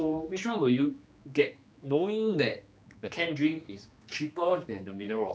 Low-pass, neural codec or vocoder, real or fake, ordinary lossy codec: none; codec, 16 kHz, 2 kbps, X-Codec, HuBERT features, trained on general audio; fake; none